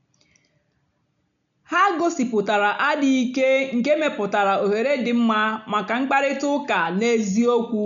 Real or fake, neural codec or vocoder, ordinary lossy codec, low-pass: real; none; none; 7.2 kHz